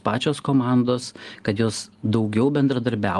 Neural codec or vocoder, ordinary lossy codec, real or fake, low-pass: none; Opus, 24 kbps; real; 10.8 kHz